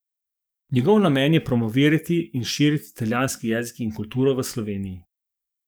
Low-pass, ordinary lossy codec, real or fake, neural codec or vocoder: none; none; fake; codec, 44.1 kHz, 7.8 kbps, DAC